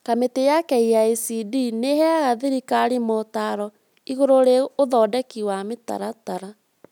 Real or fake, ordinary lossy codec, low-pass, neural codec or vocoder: real; none; 19.8 kHz; none